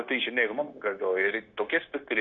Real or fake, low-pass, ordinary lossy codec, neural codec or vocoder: fake; 7.2 kHz; Opus, 64 kbps; codec, 16 kHz, 0.9 kbps, LongCat-Audio-Codec